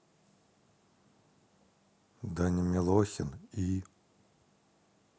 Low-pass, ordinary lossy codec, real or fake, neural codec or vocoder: none; none; real; none